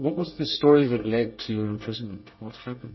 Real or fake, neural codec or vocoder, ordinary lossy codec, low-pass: fake; codec, 24 kHz, 1 kbps, SNAC; MP3, 24 kbps; 7.2 kHz